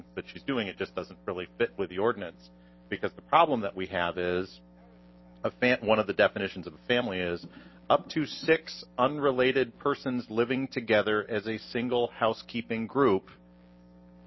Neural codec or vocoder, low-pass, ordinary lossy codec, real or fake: none; 7.2 kHz; MP3, 24 kbps; real